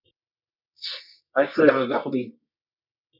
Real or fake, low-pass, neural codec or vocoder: fake; 5.4 kHz; codec, 24 kHz, 0.9 kbps, WavTokenizer, medium music audio release